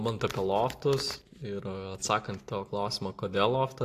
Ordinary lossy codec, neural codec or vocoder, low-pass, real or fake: AAC, 64 kbps; none; 14.4 kHz; real